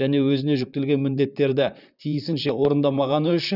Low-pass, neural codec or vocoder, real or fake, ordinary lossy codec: 5.4 kHz; vocoder, 44.1 kHz, 128 mel bands, Pupu-Vocoder; fake; none